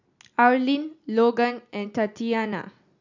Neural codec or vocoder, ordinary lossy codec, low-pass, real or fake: none; none; 7.2 kHz; real